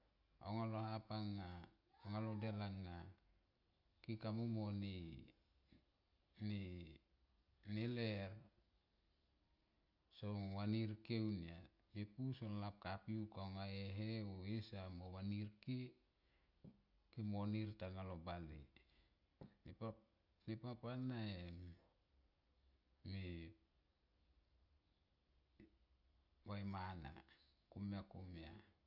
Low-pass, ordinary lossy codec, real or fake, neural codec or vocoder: 5.4 kHz; none; real; none